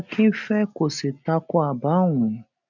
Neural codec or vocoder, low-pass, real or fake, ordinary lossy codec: none; 7.2 kHz; real; none